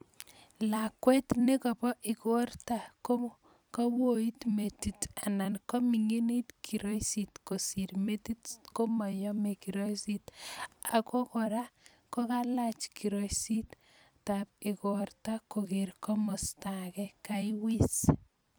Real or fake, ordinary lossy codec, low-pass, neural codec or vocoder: fake; none; none; vocoder, 44.1 kHz, 128 mel bands every 256 samples, BigVGAN v2